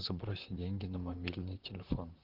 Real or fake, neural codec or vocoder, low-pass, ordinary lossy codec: fake; vocoder, 44.1 kHz, 128 mel bands, Pupu-Vocoder; 5.4 kHz; Opus, 16 kbps